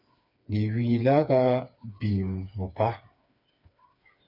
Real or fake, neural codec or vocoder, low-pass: fake; codec, 16 kHz, 4 kbps, FreqCodec, smaller model; 5.4 kHz